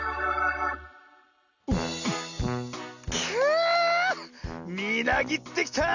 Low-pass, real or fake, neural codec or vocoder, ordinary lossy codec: 7.2 kHz; real; none; none